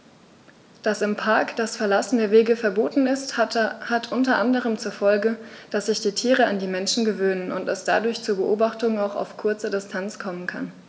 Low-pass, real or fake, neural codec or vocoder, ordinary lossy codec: none; real; none; none